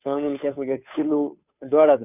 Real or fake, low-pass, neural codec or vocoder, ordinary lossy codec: fake; 3.6 kHz; codec, 16 kHz, 2 kbps, FunCodec, trained on Chinese and English, 25 frames a second; none